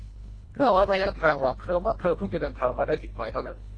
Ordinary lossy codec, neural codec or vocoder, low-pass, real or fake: AAC, 32 kbps; codec, 24 kHz, 1.5 kbps, HILCodec; 9.9 kHz; fake